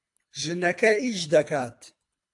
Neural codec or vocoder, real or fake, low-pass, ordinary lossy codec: codec, 24 kHz, 3 kbps, HILCodec; fake; 10.8 kHz; AAC, 64 kbps